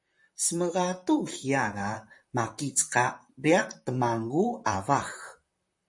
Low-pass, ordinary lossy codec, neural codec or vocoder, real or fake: 10.8 kHz; MP3, 48 kbps; vocoder, 44.1 kHz, 128 mel bands, Pupu-Vocoder; fake